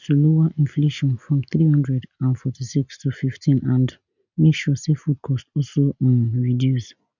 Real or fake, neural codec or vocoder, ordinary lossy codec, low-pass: real; none; none; 7.2 kHz